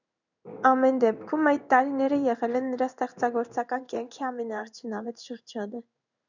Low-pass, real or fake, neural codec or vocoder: 7.2 kHz; fake; codec, 16 kHz in and 24 kHz out, 1 kbps, XY-Tokenizer